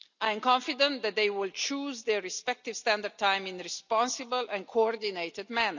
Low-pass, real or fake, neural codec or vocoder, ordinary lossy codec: 7.2 kHz; real; none; none